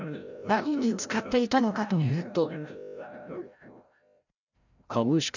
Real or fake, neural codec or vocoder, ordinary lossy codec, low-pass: fake; codec, 16 kHz, 0.5 kbps, FreqCodec, larger model; none; 7.2 kHz